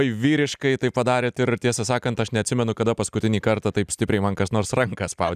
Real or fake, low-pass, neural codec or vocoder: real; 14.4 kHz; none